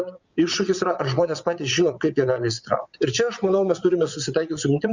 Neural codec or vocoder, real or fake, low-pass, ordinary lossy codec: vocoder, 22.05 kHz, 80 mel bands, WaveNeXt; fake; 7.2 kHz; Opus, 64 kbps